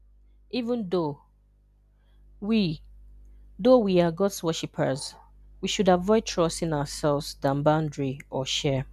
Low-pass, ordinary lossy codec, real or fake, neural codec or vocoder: 14.4 kHz; none; real; none